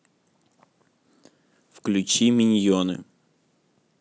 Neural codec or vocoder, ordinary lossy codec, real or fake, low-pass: none; none; real; none